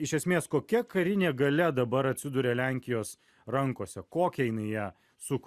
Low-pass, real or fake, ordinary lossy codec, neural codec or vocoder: 14.4 kHz; real; Opus, 64 kbps; none